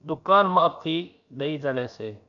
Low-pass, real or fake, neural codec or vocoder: 7.2 kHz; fake; codec, 16 kHz, about 1 kbps, DyCAST, with the encoder's durations